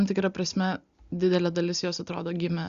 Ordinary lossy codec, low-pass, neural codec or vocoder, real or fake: Opus, 64 kbps; 7.2 kHz; none; real